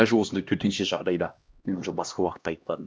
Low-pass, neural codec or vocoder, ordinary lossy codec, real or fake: none; codec, 16 kHz, 1 kbps, X-Codec, HuBERT features, trained on LibriSpeech; none; fake